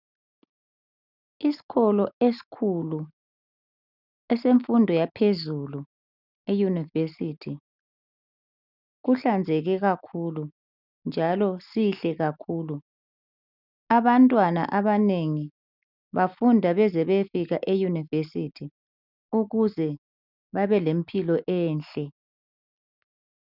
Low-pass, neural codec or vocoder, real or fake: 5.4 kHz; none; real